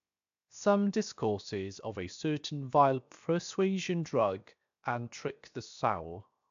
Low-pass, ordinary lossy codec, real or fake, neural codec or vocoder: 7.2 kHz; AAC, 64 kbps; fake; codec, 16 kHz, 0.7 kbps, FocalCodec